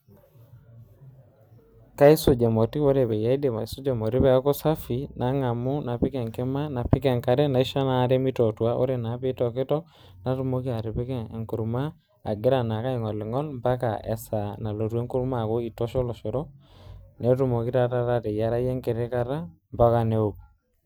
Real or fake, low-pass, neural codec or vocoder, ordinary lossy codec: real; none; none; none